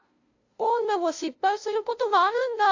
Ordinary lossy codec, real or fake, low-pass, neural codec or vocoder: AAC, 48 kbps; fake; 7.2 kHz; codec, 16 kHz, 0.5 kbps, FunCodec, trained on LibriTTS, 25 frames a second